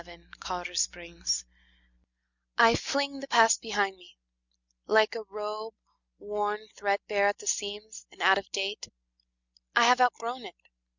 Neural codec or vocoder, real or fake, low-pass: none; real; 7.2 kHz